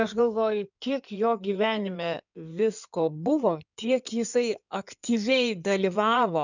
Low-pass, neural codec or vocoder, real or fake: 7.2 kHz; codec, 16 kHz in and 24 kHz out, 2.2 kbps, FireRedTTS-2 codec; fake